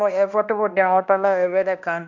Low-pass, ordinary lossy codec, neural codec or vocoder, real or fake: 7.2 kHz; none; codec, 16 kHz, 1 kbps, X-Codec, HuBERT features, trained on balanced general audio; fake